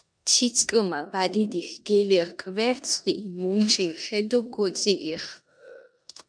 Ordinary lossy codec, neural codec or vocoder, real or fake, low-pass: MP3, 96 kbps; codec, 16 kHz in and 24 kHz out, 0.9 kbps, LongCat-Audio-Codec, four codebook decoder; fake; 9.9 kHz